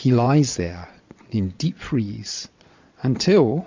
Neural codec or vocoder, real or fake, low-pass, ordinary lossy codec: vocoder, 22.05 kHz, 80 mel bands, Vocos; fake; 7.2 kHz; MP3, 64 kbps